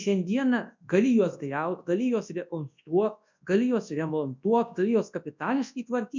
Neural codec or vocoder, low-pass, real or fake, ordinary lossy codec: codec, 24 kHz, 0.9 kbps, WavTokenizer, large speech release; 7.2 kHz; fake; MP3, 64 kbps